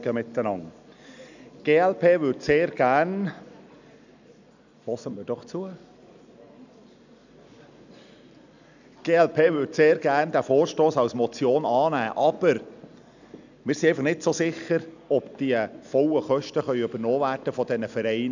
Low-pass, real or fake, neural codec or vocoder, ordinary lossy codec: 7.2 kHz; real; none; none